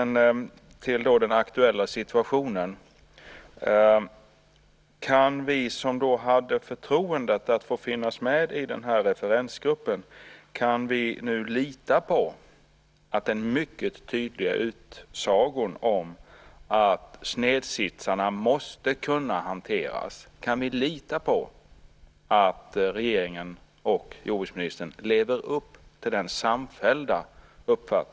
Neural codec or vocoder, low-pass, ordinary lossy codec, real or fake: none; none; none; real